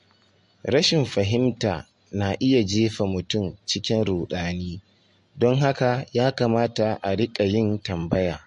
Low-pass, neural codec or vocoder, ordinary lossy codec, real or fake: 10.8 kHz; none; MP3, 48 kbps; real